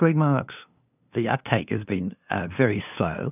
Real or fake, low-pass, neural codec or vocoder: fake; 3.6 kHz; codec, 16 kHz, 2 kbps, FunCodec, trained on LibriTTS, 25 frames a second